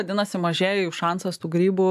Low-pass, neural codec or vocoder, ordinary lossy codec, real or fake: 14.4 kHz; none; AAC, 96 kbps; real